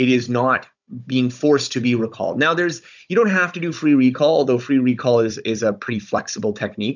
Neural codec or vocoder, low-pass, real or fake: codec, 16 kHz, 16 kbps, FunCodec, trained on Chinese and English, 50 frames a second; 7.2 kHz; fake